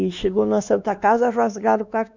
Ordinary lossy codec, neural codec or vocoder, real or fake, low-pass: none; codec, 16 kHz, 2 kbps, X-Codec, WavLM features, trained on Multilingual LibriSpeech; fake; 7.2 kHz